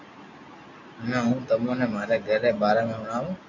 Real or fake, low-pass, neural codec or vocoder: real; 7.2 kHz; none